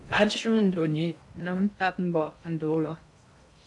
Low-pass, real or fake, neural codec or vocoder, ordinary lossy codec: 10.8 kHz; fake; codec, 16 kHz in and 24 kHz out, 0.6 kbps, FocalCodec, streaming, 2048 codes; AAC, 64 kbps